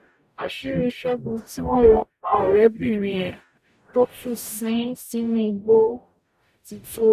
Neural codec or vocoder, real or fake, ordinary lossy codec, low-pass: codec, 44.1 kHz, 0.9 kbps, DAC; fake; none; 14.4 kHz